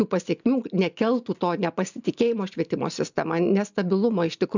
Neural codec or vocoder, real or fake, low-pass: none; real; 7.2 kHz